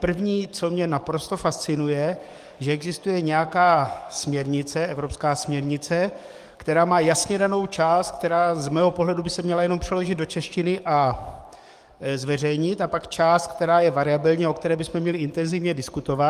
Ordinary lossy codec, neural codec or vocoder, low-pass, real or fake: Opus, 32 kbps; codec, 44.1 kHz, 7.8 kbps, DAC; 14.4 kHz; fake